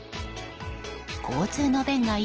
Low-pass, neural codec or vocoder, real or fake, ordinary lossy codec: 7.2 kHz; none; real; Opus, 16 kbps